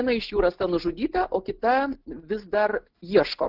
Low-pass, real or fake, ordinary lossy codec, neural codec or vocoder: 5.4 kHz; real; Opus, 16 kbps; none